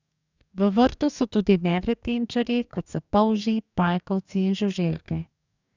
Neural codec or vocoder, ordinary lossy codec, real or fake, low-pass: codec, 44.1 kHz, 2.6 kbps, DAC; none; fake; 7.2 kHz